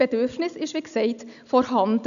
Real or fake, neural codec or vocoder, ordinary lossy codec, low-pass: real; none; none; 7.2 kHz